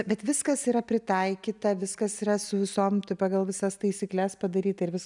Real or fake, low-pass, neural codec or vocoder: real; 10.8 kHz; none